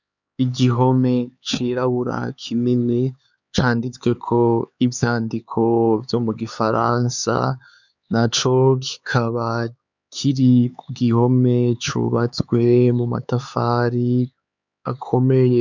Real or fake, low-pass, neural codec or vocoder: fake; 7.2 kHz; codec, 16 kHz, 4 kbps, X-Codec, HuBERT features, trained on LibriSpeech